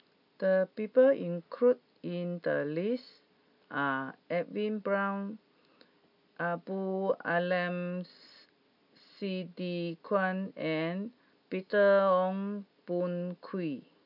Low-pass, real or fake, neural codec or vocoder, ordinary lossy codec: 5.4 kHz; real; none; none